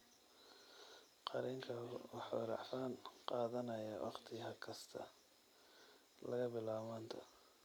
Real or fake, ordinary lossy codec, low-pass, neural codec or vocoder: fake; none; none; vocoder, 44.1 kHz, 128 mel bands every 256 samples, BigVGAN v2